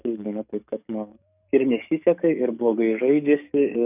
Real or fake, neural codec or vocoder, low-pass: fake; codec, 44.1 kHz, 7.8 kbps, DAC; 3.6 kHz